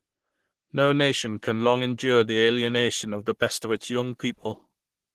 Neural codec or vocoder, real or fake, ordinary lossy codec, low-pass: codec, 44.1 kHz, 3.4 kbps, Pupu-Codec; fake; Opus, 16 kbps; 14.4 kHz